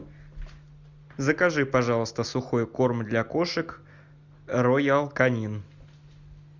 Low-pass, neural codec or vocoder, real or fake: 7.2 kHz; none; real